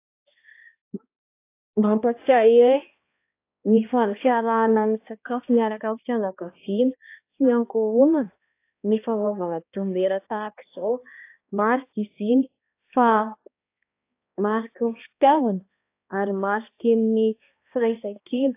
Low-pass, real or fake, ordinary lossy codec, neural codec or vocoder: 3.6 kHz; fake; AAC, 24 kbps; codec, 16 kHz, 1 kbps, X-Codec, HuBERT features, trained on balanced general audio